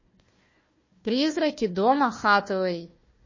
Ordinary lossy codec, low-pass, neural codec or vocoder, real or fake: MP3, 32 kbps; 7.2 kHz; codec, 16 kHz, 1 kbps, FunCodec, trained on Chinese and English, 50 frames a second; fake